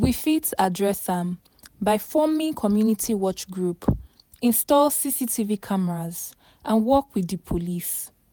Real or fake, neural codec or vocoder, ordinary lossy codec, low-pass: fake; vocoder, 48 kHz, 128 mel bands, Vocos; none; none